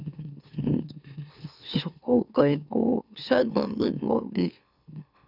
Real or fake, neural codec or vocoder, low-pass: fake; autoencoder, 44.1 kHz, a latent of 192 numbers a frame, MeloTTS; 5.4 kHz